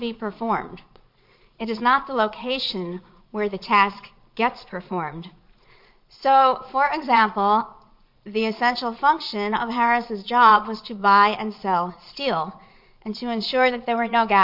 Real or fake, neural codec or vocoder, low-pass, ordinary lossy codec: fake; vocoder, 22.05 kHz, 80 mel bands, Vocos; 5.4 kHz; MP3, 48 kbps